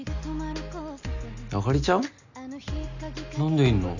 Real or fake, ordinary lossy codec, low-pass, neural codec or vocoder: real; none; 7.2 kHz; none